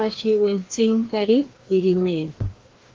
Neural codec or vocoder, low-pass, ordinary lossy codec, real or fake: codec, 16 kHz, 1 kbps, FreqCodec, larger model; 7.2 kHz; Opus, 16 kbps; fake